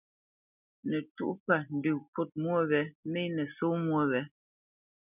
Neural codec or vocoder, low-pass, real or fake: none; 3.6 kHz; real